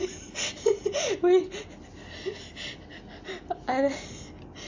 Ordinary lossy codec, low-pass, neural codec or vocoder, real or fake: AAC, 48 kbps; 7.2 kHz; none; real